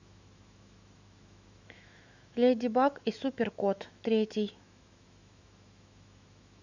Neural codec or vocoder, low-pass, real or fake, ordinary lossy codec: autoencoder, 48 kHz, 128 numbers a frame, DAC-VAE, trained on Japanese speech; 7.2 kHz; fake; none